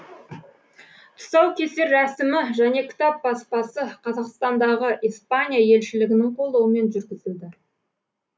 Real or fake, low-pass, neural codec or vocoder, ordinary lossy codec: real; none; none; none